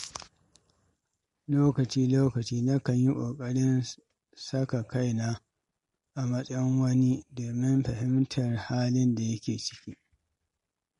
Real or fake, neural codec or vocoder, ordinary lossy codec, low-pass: real; none; MP3, 48 kbps; 14.4 kHz